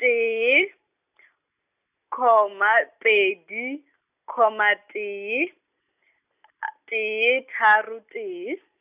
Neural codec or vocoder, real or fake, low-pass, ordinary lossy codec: none; real; 3.6 kHz; none